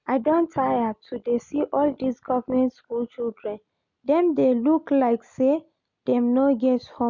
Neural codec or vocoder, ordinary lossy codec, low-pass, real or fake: none; none; 7.2 kHz; real